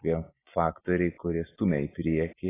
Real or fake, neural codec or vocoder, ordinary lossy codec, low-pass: real; none; AAC, 16 kbps; 3.6 kHz